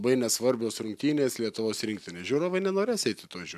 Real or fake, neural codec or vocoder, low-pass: real; none; 14.4 kHz